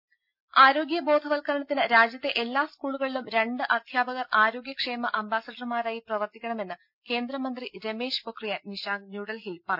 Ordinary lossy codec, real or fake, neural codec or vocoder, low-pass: none; real; none; 5.4 kHz